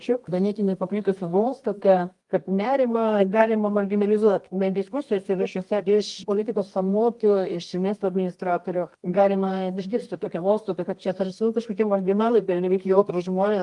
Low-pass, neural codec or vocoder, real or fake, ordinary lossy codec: 10.8 kHz; codec, 24 kHz, 0.9 kbps, WavTokenizer, medium music audio release; fake; Opus, 32 kbps